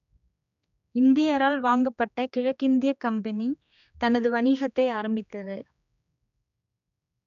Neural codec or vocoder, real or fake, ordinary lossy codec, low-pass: codec, 16 kHz, 2 kbps, X-Codec, HuBERT features, trained on general audio; fake; none; 7.2 kHz